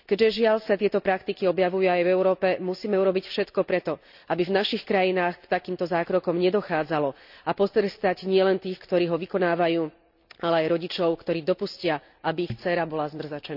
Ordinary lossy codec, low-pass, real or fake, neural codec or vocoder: none; 5.4 kHz; real; none